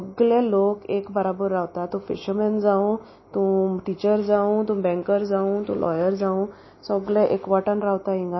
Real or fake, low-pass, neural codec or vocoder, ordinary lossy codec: real; 7.2 kHz; none; MP3, 24 kbps